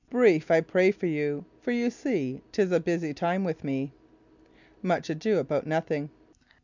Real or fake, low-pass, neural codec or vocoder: real; 7.2 kHz; none